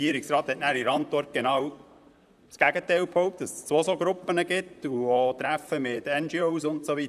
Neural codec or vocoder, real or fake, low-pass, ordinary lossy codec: vocoder, 44.1 kHz, 128 mel bands, Pupu-Vocoder; fake; 14.4 kHz; none